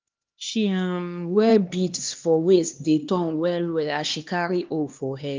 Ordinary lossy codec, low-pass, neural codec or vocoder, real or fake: Opus, 32 kbps; 7.2 kHz; codec, 16 kHz, 2 kbps, X-Codec, HuBERT features, trained on LibriSpeech; fake